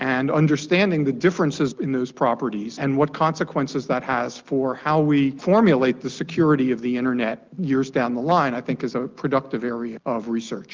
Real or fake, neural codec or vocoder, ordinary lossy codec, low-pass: real; none; Opus, 24 kbps; 7.2 kHz